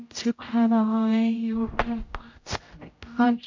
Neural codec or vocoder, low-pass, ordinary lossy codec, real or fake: codec, 16 kHz, 0.5 kbps, X-Codec, HuBERT features, trained on general audio; 7.2 kHz; MP3, 64 kbps; fake